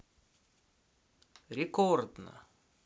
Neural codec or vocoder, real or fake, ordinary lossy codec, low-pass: none; real; none; none